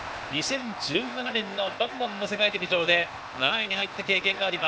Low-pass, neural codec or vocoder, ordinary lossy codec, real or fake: none; codec, 16 kHz, 0.8 kbps, ZipCodec; none; fake